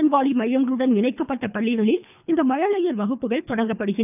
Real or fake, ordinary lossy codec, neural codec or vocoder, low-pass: fake; none; codec, 24 kHz, 3 kbps, HILCodec; 3.6 kHz